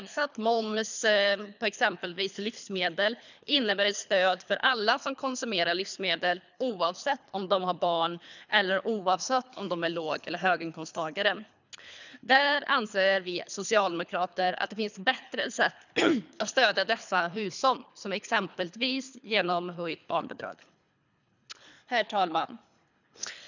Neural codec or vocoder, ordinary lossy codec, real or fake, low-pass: codec, 24 kHz, 3 kbps, HILCodec; none; fake; 7.2 kHz